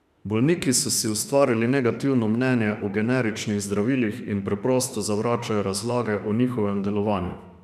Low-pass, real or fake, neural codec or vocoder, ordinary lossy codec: 14.4 kHz; fake; autoencoder, 48 kHz, 32 numbers a frame, DAC-VAE, trained on Japanese speech; none